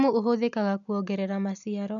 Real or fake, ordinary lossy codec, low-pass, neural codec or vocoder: real; none; 7.2 kHz; none